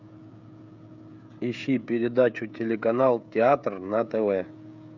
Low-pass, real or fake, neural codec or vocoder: 7.2 kHz; fake; codec, 16 kHz, 16 kbps, FreqCodec, smaller model